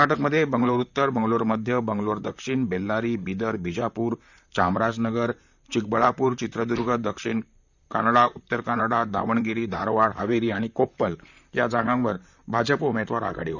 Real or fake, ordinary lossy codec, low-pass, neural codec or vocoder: fake; none; 7.2 kHz; vocoder, 44.1 kHz, 128 mel bands, Pupu-Vocoder